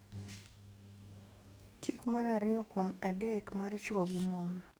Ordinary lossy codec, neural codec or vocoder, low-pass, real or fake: none; codec, 44.1 kHz, 2.6 kbps, DAC; none; fake